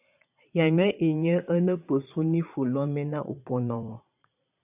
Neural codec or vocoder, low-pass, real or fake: vocoder, 22.05 kHz, 80 mel bands, Vocos; 3.6 kHz; fake